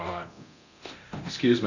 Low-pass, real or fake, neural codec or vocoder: 7.2 kHz; fake; codec, 24 kHz, 0.9 kbps, DualCodec